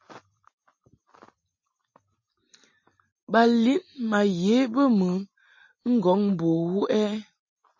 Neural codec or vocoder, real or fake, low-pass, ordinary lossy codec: none; real; 7.2 kHz; MP3, 32 kbps